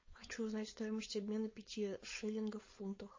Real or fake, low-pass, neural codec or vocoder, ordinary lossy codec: fake; 7.2 kHz; codec, 16 kHz, 4.8 kbps, FACodec; MP3, 32 kbps